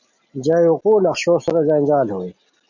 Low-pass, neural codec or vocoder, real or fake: 7.2 kHz; none; real